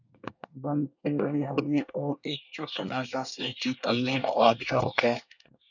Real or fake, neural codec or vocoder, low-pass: fake; codec, 24 kHz, 1 kbps, SNAC; 7.2 kHz